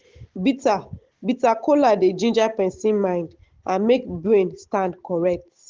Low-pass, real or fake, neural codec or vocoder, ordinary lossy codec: 7.2 kHz; real; none; Opus, 16 kbps